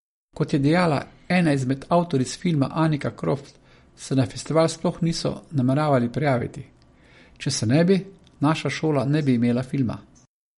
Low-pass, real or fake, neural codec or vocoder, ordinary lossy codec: 19.8 kHz; real; none; MP3, 48 kbps